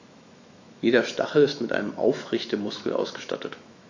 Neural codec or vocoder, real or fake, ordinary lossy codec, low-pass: autoencoder, 48 kHz, 128 numbers a frame, DAC-VAE, trained on Japanese speech; fake; AAC, 48 kbps; 7.2 kHz